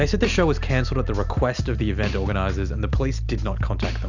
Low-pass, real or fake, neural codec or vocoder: 7.2 kHz; real; none